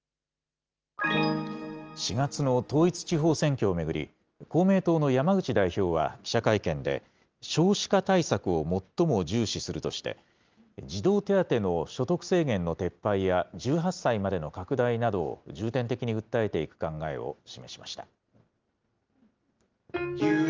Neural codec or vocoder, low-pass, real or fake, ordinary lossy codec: none; 7.2 kHz; real; Opus, 24 kbps